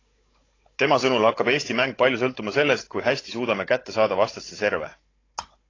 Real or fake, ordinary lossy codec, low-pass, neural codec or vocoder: fake; AAC, 32 kbps; 7.2 kHz; codec, 16 kHz, 16 kbps, FunCodec, trained on Chinese and English, 50 frames a second